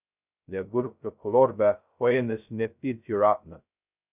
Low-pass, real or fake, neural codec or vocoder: 3.6 kHz; fake; codec, 16 kHz, 0.2 kbps, FocalCodec